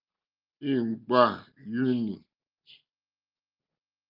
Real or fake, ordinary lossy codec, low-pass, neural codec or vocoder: real; Opus, 24 kbps; 5.4 kHz; none